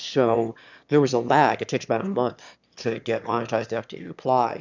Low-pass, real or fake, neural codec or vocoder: 7.2 kHz; fake; autoencoder, 22.05 kHz, a latent of 192 numbers a frame, VITS, trained on one speaker